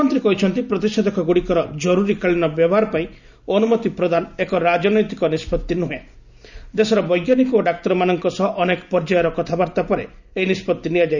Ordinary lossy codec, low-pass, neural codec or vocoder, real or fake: none; 7.2 kHz; none; real